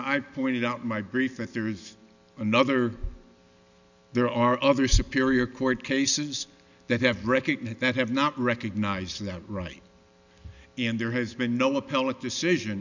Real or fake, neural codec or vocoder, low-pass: real; none; 7.2 kHz